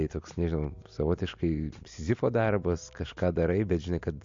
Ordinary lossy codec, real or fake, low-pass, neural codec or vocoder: MP3, 48 kbps; real; 7.2 kHz; none